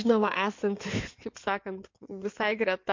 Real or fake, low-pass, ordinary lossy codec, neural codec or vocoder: fake; 7.2 kHz; MP3, 48 kbps; codec, 16 kHz in and 24 kHz out, 2.2 kbps, FireRedTTS-2 codec